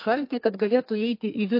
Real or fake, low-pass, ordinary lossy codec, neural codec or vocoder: fake; 5.4 kHz; MP3, 32 kbps; codec, 32 kHz, 1.9 kbps, SNAC